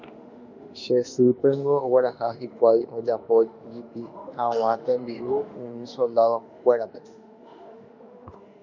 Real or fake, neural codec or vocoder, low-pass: fake; autoencoder, 48 kHz, 32 numbers a frame, DAC-VAE, trained on Japanese speech; 7.2 kHz